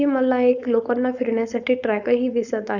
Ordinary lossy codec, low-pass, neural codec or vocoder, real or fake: none; 7.2 kHz; codec, 16 kHz, 4.8 kbps, FACodec; fake